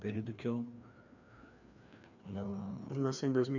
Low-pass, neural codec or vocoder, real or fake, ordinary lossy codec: 7.2 kHz; codec, 16 kHz, 2 kbps, FreqCodec, larger model; fake; none